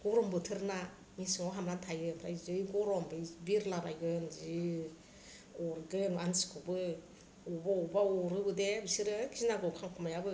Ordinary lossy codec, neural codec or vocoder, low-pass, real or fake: none; none; none; real